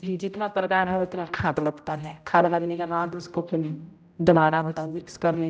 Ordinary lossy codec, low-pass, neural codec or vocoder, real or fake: none; none; codec, 16 kHz, 0.5 kbps, X-Codec, HuBERT features, trained on general audio; fake